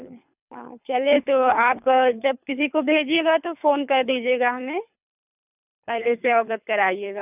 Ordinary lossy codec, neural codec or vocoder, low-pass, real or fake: none; codec, 24 kHz, 3 kbps, HILCodec; 3.6 kHz; fake